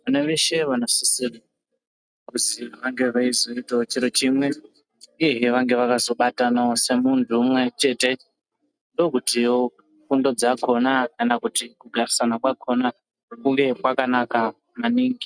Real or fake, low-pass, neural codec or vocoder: real; 9.9 kHz; none